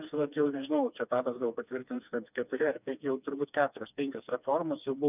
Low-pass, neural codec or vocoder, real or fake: 3.6 kHz; codec, 16 kHz, 2 kbps, FreqCodec, smaller model; fake